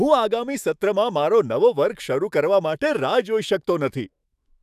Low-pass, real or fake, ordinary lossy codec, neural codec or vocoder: 14.4 kHz; fake; none; autoencoder, 48 kHz, 128 numbers a frame, DAC-VAE, trained on Japanese speech